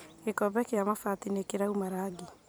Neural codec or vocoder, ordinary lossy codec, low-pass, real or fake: vocoder, 44.1 kHz, 128 mel bands every 256 samples, BigVGAN v2; none; none; fake